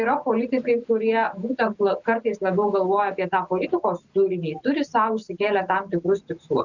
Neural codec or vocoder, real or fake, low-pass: none; real; 7.2 kHz